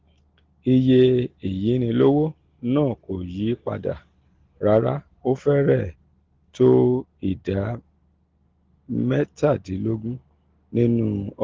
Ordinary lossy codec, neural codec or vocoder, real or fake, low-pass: Opus, 16 kbps; none; real; 7.2 kHz